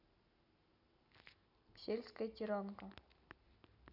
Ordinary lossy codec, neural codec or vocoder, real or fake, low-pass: none; none; real; 5.4 kHz